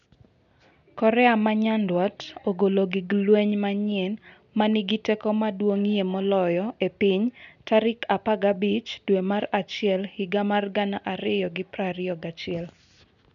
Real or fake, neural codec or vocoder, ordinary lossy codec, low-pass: real; none; none; 7.2 kHz